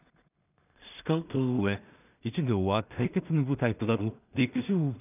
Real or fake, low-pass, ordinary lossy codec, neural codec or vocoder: fake; 3.6 kHz; none; codec, 16 kHz in and 24 kHz out, 0.4 kbps, LongCat-Audio-Codec, two codebook decoder